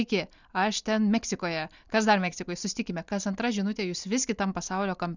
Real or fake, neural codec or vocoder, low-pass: real; none; 7.2 kHz